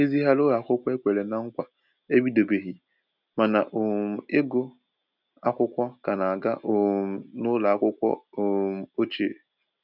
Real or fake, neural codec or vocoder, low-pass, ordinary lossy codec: real; none; 5.4 kHz; none